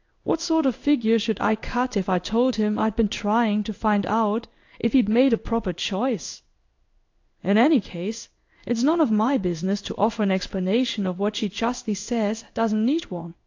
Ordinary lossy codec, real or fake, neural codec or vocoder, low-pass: AAC, 48 kbps; fake; codec, 16 kHz in and 24 kHz out, 1 kbps, XY-Tokenizer; 7.2 kHz